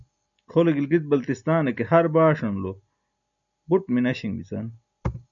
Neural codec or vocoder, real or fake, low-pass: none; real; 7.2 kHz